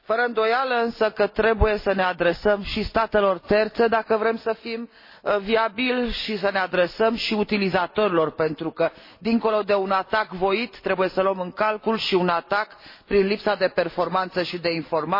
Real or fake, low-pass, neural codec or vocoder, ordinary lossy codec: real; 5.4 kHz; none; MP3, 24 kbps